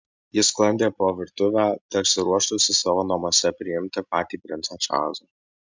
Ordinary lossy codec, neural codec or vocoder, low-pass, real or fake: MP3, 64 kbps; none; 7.2 kHz; real